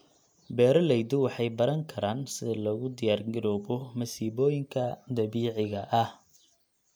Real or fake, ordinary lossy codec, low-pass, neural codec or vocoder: real; none; none; none